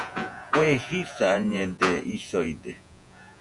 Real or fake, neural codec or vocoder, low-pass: fake; vocoder, 48 kHz, 128 mel bands, Vocos; 10.8 kHz